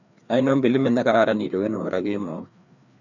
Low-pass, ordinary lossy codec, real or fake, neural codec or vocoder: 7.2 kHz; none; fake; codec, 16 kHz, 2 kbps, FreqCodec, larger model